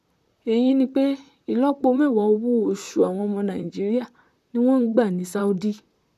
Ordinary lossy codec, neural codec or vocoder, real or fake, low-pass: none; vocoder, 44.1 kHz, 128 mel bands, Pupu-Vocoder; fake; 14.4 kHz